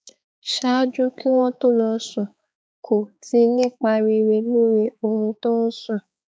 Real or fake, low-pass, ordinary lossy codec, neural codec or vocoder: fake; none; none; codec, 16 kHz, 4 kbps, X-Codec, HuBERT features, trained on balanced general audio